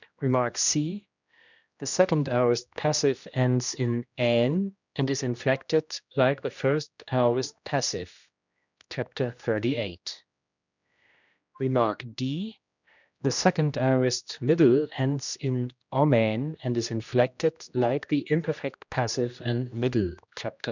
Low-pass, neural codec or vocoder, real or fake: 7.2 kHz; codec, 16 kHz, 1 kbps, X-Codec, HuBERT features, trained on general audio; fake